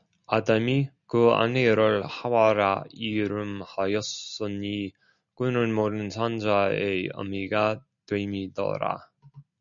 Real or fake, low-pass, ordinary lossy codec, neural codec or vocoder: real; 7.2 kHz; MP3, 96 kbps; none